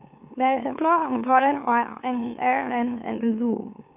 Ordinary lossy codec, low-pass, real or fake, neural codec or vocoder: none; 3.6 kHz; fake; autoencoder, 44.1 kHz, a latent of 192 numbers a frame, MeloTTS